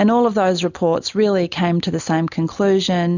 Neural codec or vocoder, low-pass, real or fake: none; 7.2 kHz; real